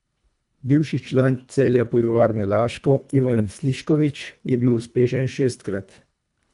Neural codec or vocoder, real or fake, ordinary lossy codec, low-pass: codec, 24 kHz, 1.5 kbps, HILCodec; fake; none; 10.8 kHz